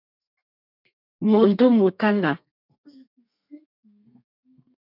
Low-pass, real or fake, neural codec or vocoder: 5.4 kHz; fake; codec, 32 kHz, 1.9 kbps, SNAC